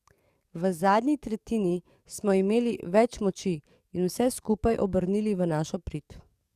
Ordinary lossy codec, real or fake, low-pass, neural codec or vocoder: Opus, 64 kbps; fake; 14.4 kHz; codec, 44.1 kHz, 7.8 kbps, DAC